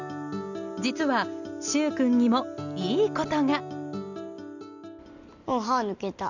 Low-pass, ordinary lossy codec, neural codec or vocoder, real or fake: 7.2 kHz; none; none; real